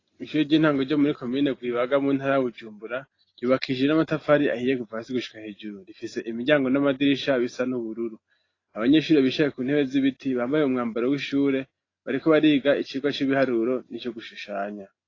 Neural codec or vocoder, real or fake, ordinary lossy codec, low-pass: none; real; AAC, 32 kbps; 7.2 kHz